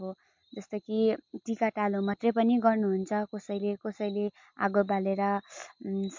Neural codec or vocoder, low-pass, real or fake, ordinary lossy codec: none; 7.2 kHz; real; MP3, 48 kbps